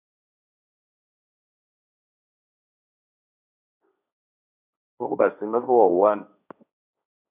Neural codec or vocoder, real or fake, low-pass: codec, 16 kHz, 1.1 kbps, Voila-Tokenizer; fake; 3.6 kHz